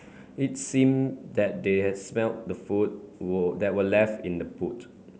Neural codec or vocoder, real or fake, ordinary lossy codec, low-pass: none; real; none; none